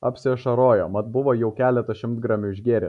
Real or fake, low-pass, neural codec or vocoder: real; 10.8 kHz; none